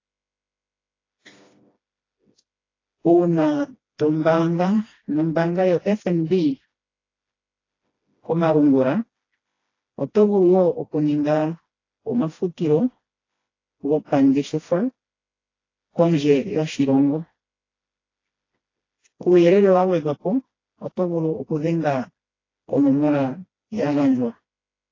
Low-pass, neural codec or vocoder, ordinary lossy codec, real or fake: 7.2 kHz; codec, 16 kHz, 1 kbps, FreqCodec, smaller model; AAC, 32 kbps; fake